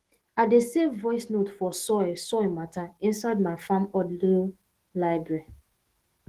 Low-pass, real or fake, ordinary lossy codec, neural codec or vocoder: 14.4 kHz; fake; Opus, 16 kbps; autoencoder, 48 kHz, 128 numbers a frame, DAC-VAE, trained on Japanese speech